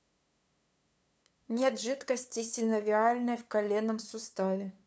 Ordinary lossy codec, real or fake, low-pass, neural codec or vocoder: none; fake; none; codec, 16 kHz, 2 kbps, FunCodec, trained on LibriTTS, 25 frames a second